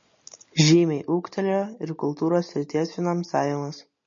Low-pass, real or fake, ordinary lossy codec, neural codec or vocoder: 7.2 kHz; real; MP3, 32 kbps; none